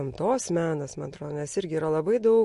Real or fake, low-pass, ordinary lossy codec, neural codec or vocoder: real; 14.4 kHz; MP3, 48 kbps; none